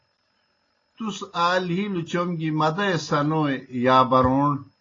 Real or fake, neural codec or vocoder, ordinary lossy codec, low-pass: real; none; AAC, 32 kbps; 7.2 kHz